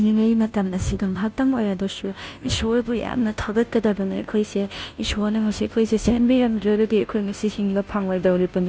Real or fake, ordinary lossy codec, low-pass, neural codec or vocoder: fake; none; none; codec, 16 kHz, 0.5 kbps, FunCodec, trained on Chinese and English, 25 frames a second